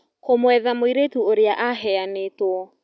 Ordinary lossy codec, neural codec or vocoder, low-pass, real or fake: none; none; none; real